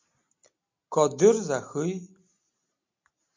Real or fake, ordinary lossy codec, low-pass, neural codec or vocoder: real; MP3, 48 kbps; 7.2 kHz; none